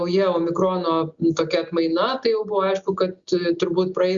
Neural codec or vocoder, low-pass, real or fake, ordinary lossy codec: none; 7.2 kHz; real; Opus, 64 kbps